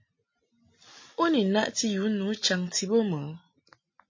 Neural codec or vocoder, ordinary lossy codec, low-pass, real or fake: none; MP3, 32 kbps; 7.2 kHz; real